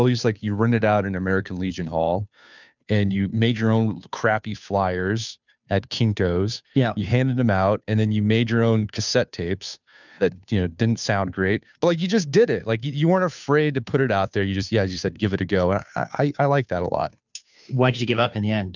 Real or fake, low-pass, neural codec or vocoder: fake; 7.2 kHz; codec, 16 kHz, 2 kbps, FunCodec, trained on Chinese and English, 25 frames a second